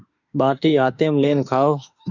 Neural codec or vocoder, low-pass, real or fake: autoencoder, 48 kHz, 32 numbers a frame, DAC-VAE, trained on Japanese speech; 7.2 kHz; fake